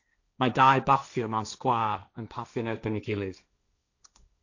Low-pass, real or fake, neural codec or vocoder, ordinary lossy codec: 7.2 kHz; fake; codec, 16 kHz, 1.1 kbps, Voila-Tokenizer; AAC, 48 kbps